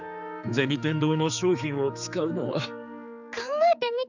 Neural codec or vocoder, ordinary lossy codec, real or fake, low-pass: codec, 16 kHz, 4 kbps, X-Codec, HuBERT features, trained on general audio; none; fake; 7.2 kHz